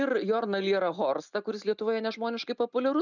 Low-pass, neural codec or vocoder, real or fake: 7.2 kHz; none; real